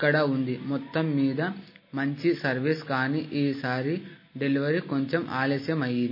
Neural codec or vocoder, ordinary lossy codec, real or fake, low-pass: none; MP3, 24 kbps; real; 5.4 kHz